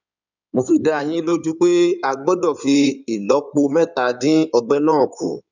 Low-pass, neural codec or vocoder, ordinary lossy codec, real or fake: 7.2 kHz; codec, 16 kHz in and 24 kHz out, 2.2 kbps, FireRedTTS-2 codec; none; fake